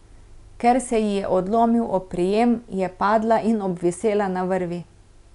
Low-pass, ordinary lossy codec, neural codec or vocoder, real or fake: 10.8 kHz; none; none; real